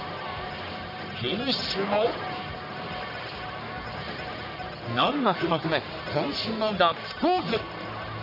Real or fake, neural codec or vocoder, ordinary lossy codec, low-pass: fake; codec, 44.1 kHz, 1.7 kbps, Pupu-Codec; none; 5.4 kHz